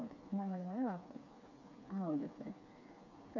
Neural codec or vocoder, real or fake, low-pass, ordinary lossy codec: codec, 16 kHz, 4 kbps, FreqCodec, smaller model; fake; 7.2 kHz; MP3, 64 kbps